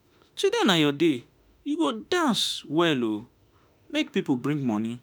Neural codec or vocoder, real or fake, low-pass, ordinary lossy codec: autoencoder, 48 kHz, 32 numbers a frame, DAC-VAE, trained on Japanese speech; fake; none; none